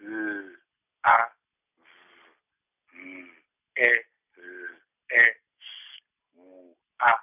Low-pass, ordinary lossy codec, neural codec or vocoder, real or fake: 3.6 kHz; none; none; real